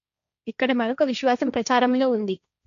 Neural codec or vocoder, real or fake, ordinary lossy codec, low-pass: codec, 16 kHz, 1.1 kbps, Voila-Tokenizer; fake; none; 7.2 kHz